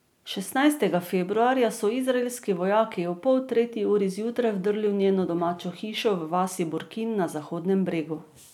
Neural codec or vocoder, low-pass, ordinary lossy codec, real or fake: none; 19.8 kHz; none; real